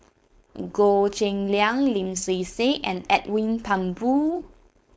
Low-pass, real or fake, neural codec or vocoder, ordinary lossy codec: none; fake; codec, 16 kHz, 4.8 kbps, FACodec; none